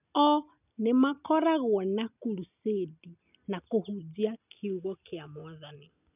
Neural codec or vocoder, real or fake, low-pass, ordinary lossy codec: none; real; 3.6 kHz; none